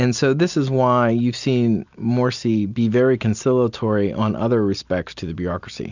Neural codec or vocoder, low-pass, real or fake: none; 7.2 kHz; real